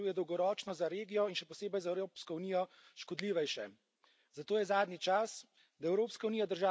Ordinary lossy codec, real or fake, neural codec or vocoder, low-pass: none; real; none; none